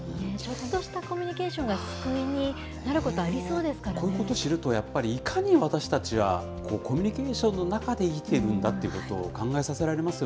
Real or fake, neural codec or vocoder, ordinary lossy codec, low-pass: real; none; none; none